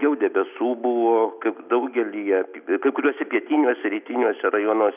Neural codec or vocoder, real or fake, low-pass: none; real; 3.6 kHz